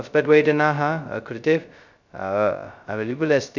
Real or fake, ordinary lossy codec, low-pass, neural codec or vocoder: fake; none; 7.2 kHz; codec, 16 kHz, 0.2 kbps, FocalCodec